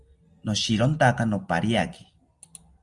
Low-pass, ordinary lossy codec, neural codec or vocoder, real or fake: 10.8 kHz; Opus, 32 kbps; none; real